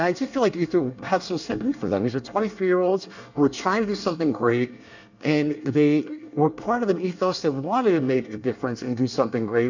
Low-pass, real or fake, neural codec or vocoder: 7.2 kHz; fake; codec, 24 kHz, 1 kbps, SNAC